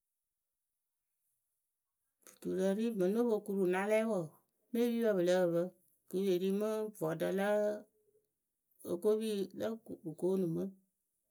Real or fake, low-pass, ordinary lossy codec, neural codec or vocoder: real; none; none; none